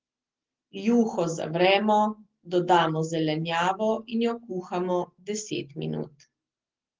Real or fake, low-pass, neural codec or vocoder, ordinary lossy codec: real; 7.2 kHz; none; Opus, 16 kbps